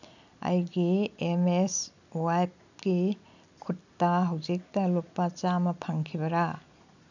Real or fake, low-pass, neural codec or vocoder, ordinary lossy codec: real; 7.2 kHz; none; none